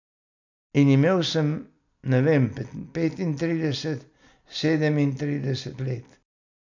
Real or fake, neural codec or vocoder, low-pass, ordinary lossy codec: real; none; 7.2 kHz; none